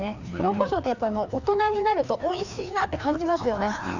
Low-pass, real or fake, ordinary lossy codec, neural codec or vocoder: 7.2 kHz; fake; none; codec, 16 kHz, 2 kbps, FreqCodec, larger model